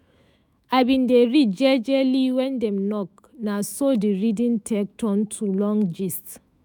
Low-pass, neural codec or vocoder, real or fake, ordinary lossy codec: none; autoencoder, 48 kHz, 128 numbers a frame, DAC-VAE, trained on Japanese speech; fake; none